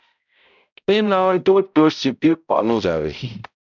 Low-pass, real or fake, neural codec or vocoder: 7.2 kHz; fake; codec, 16 kHz, 0.5 kbps, X-Codec, HuBERT features, trained on balanced general audio